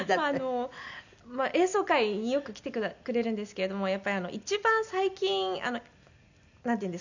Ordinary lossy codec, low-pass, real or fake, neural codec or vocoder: none; 7.2 kHz; real; none